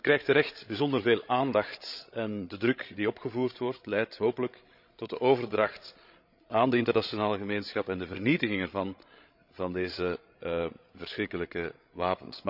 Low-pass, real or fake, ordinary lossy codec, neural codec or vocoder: 5.4 kHz; fake; none; codec, 16 kHz, 16 kbps, FreqCodec, larger model